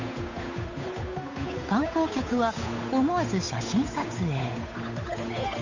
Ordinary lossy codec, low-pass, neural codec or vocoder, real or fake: none; 7.2 kHz; codec, 16 kHz, 8 kbps, FunCodec, trained on Chinese and English, 25 frames a second; fake